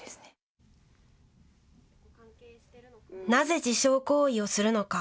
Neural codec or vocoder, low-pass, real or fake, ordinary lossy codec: none; none; real; none